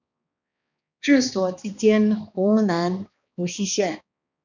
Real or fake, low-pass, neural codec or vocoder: fake; 7.2 kHz; codec, 16 kHz, 2 kbps, X-Codec, HuBERT features, trained on balanced general audio